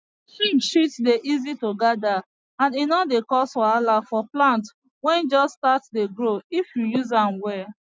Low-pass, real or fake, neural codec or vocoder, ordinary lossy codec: none; real; none; none